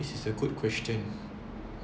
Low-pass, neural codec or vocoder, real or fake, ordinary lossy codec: none; none; real; none